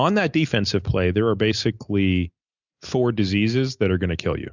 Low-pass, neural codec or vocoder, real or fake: 7.2 kHz; none; real